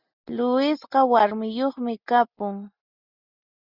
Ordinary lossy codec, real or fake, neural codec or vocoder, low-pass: Opus, 64 kbps; real; none; 5.4 kHz